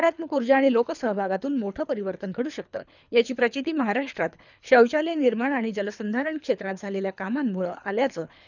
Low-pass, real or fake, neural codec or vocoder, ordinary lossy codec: 7.2 kHz; fake; codec, 24 kHz, 3 kbps, HILCodec; none